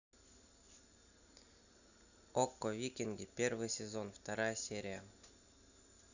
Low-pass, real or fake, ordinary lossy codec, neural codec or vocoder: 7.2 kHz; real; none; none